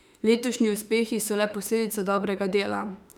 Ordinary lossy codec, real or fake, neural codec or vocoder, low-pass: none; fake; autoencoder, 48 kHz, 32 numbers a frame, DAC-VAE, trained on Japanese speech; 19.8 kHz